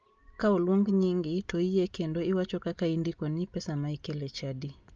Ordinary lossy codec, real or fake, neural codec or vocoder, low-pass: Opus, 24 kbps; real; none; 7.2 kHz